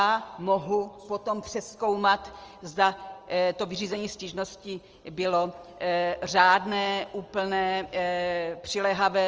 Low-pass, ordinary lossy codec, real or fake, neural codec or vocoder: 7.2 kHz; Opus, 24 kbps; real; none